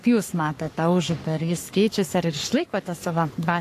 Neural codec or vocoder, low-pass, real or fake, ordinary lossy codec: codec, 44.1 kHz, 3.4 kbps, Pupu-Codec; 14.4 kHz; fake; AAC, 64 kbps